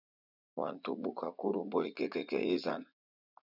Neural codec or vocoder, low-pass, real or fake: vocoder, 44.1 kHz, 80 mel bands, Vocos; 5.4 kHz; fake